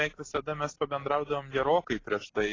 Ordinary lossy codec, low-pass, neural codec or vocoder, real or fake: AAC, 32 kbps; 7.2 kHz; none; real